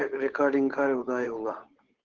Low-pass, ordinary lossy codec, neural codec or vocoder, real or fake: 7.2 kHz; Opus, 16 kbps; codec, 16 kHz, 8 kbps, FunCodec, trained on Chinese and English, 25 frames a second; fake